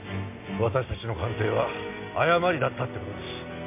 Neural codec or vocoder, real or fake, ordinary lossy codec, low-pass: none; real; MP3, 24 kbps; 3.6 kHz